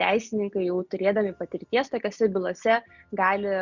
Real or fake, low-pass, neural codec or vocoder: real; 7.2 kHz; none